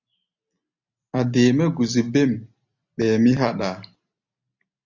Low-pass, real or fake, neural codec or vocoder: 7.2 kHz; real; none